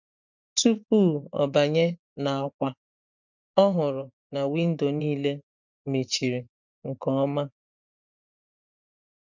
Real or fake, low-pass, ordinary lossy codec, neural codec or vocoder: fake; 7.2 kHz; none; vocoder, 24 kHz, 100 mel bands, Vocos